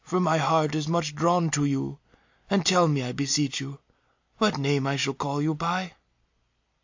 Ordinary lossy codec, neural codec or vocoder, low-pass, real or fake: AAC, 48 kbps; none; 7.2 kHz; real